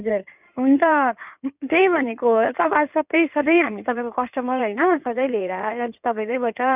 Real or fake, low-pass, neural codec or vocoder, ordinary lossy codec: fake; 3.6 kHz; codec, 16 kHz in and 24 kHz out, 2.2 kbps, FireRedTTS-2 codec; none